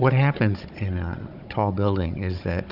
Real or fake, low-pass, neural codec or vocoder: fake; 5.4 kHz; codec, 16 kHz, 16 kbps, FunCodec, trained on Chinese and English, 50 frames a second